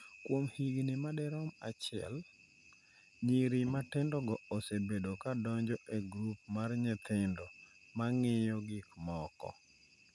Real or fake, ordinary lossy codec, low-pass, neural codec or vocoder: real; none; none; none